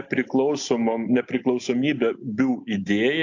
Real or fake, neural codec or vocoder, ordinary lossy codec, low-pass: real; none; AAC, 48 kbps; 7.2 kHz